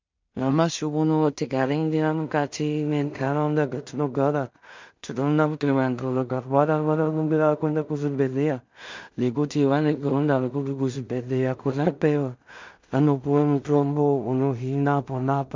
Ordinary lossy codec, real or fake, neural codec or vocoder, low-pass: MP3, 64 kbps; fake; codec, 16 kHz in and 24 kHz out, 0.4 kbps, LongCat-Audio-Codec, two codebook decoder; 7.2 kHz